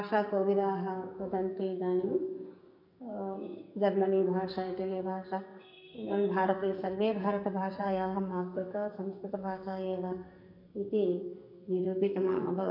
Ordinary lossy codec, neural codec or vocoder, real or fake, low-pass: none; codec, 44.1 kHz, 2.6 kbps, SNAC; fake; 5.4 kHz